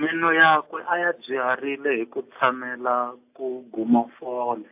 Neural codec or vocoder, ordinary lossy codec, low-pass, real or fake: none; AAC, 32 kbps; 3.6 kHz; real